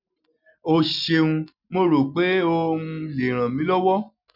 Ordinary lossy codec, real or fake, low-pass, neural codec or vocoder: none; real; 5.4 kHz; none